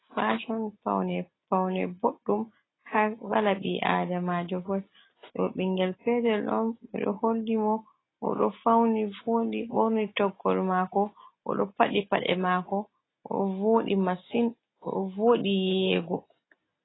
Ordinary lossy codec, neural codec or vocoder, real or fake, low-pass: AAC, 16 kbps; none; real; 7.2 kHz